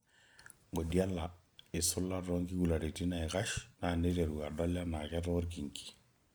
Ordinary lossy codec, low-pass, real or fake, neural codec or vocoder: none; none; real; none